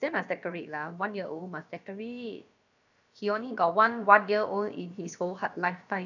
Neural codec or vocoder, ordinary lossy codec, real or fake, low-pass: codec, 16 kHz, about 1 kbps, DyCAST, with the encoder's durations; none; fake; 7.2 kHz